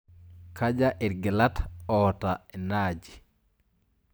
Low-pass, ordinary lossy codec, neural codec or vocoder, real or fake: none; none; none; real